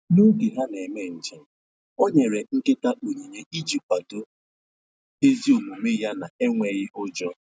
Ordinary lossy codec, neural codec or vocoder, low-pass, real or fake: none; none; none; real